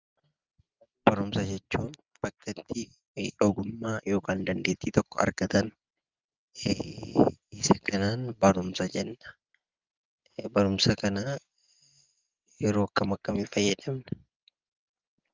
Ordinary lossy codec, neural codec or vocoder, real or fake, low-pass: Opus, 32 kbps; none; real; 7.2 kHz